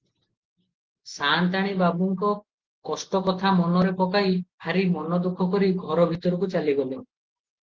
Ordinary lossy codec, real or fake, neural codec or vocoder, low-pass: Opus, 16 kbps; real; none; 7.2 kHz